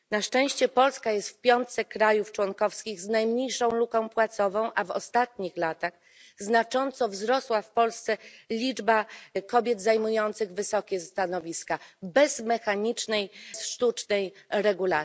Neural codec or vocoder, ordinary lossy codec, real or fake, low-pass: none; none; real; none